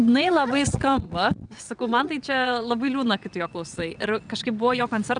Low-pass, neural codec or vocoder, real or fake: 9.9 kHz; vocoder, 22.05 kHz, 80 mel bands, WaveNeXt; fake